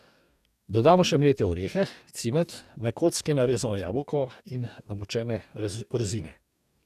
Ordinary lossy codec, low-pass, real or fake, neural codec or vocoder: none; 14.4 kHz; fake; codec, 44.1 kHz, 2.6 kbps, DAC